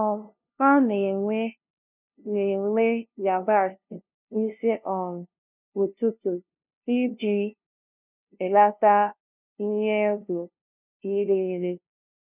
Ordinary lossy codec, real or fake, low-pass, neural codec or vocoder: none; fake; 3.6 kHz; codec, 16 kHz, 0.5 kbps, FunCodec, trained on LibriTTS, 25 frames a second